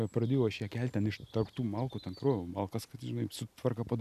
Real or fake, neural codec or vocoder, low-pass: real; none; 14.4 kHz